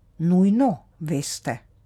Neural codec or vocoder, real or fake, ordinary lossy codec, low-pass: none; real; none; 19.8 kHz